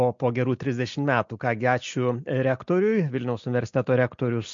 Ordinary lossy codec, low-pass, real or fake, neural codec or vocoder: MP3, 48 kbps; 7.2 kHz; real; none